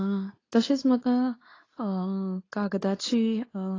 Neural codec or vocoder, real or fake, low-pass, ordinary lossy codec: codec, 24 kHz, 0.9 kbps, WavTokenizer, medium speech release version 2; fake; 7.2 kHz; AAC, 32 kbps